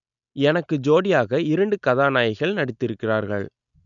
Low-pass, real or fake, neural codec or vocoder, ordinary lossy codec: 7.2 kHz; real; none; MP3, 96 kbps